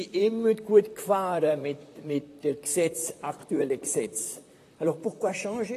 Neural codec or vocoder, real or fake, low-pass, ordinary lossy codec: vocoder, 44.1 kHz, 128 mel bands, Pupu-Vocoder; fake; 14.4 kHz; AAC, 48 kbps